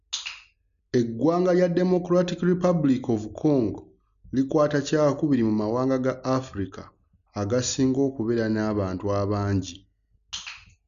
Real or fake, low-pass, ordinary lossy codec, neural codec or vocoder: real; 7.2 kHz; none; none